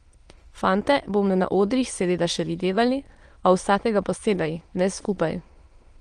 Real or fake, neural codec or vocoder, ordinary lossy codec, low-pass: fake; autoencoder, 22.05 kHz, a latent of 192 numbers a frame, VITS, trained on many speakers; Opus, 24 kbps; 9.9 kHz